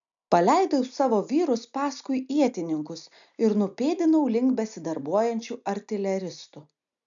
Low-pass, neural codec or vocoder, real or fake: 7.2 kHz; none; real